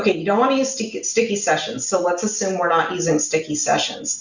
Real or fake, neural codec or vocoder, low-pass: real; none; 7.2 kHz